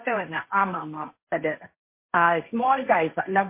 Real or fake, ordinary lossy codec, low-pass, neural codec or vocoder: fake; MP3, 24 kbps; 3.6 kHz; codec, 16 kHz, 1.1 kbps, Voila-Tokenizer